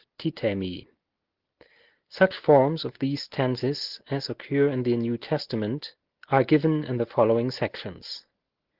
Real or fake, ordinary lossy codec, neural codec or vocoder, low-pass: real; Opus, 16 kbps; none; 5.4 kHz